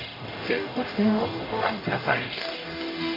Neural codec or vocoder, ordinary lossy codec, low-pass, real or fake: codec, 44.1 kHz, 0.9 kbps, DAC; AAC, 24 kbps; 5.4 kHz; fake